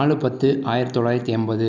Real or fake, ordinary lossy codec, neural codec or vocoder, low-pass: real; none; none; 7.2 kHz